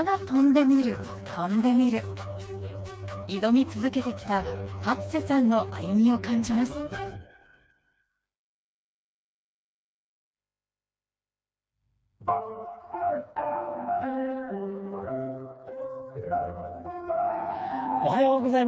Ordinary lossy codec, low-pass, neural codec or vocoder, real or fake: none; none; codec, 16 kHz, 2 kbps, FreqCodec, smaller model; fake